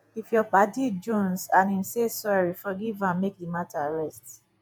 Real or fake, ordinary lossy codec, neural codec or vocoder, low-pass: fake; none; vocoder, 48 kHz, 128 mel bands, Vocos; none